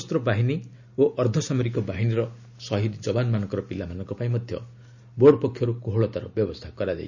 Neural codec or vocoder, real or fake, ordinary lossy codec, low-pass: none; real; none; 7.2 kHz